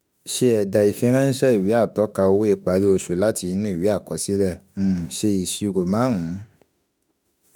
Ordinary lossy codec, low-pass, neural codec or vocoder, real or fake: none; none; autoencoder, 48 kHz, 32 numbers a frame, DAC-VAE, trained on Japanese speech; fake